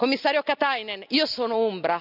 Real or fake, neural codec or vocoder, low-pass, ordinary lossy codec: real; none; 5.4 kHz; none